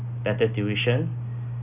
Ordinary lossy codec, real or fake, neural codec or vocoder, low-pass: none; real; none; 3.6 kHz